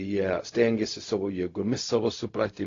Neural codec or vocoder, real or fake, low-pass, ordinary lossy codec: codec, 16 kHz, 0.4 kbps, LongCat-Audio-Codec; fake; 7.2 kHz; AAC, 32 kbps